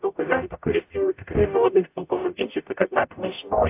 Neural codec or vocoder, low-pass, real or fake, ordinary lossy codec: codec, 44.1 kHz, 0.9 kbps, DAC; 3.6 kHz; fake; AAC, 32 kbps